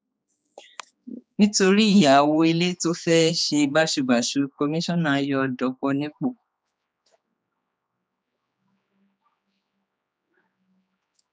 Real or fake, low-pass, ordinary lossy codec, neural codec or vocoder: fake; none; none; codec, 16 kHz, 4 kbps, X-Codec, HuBERT features, trained on general audio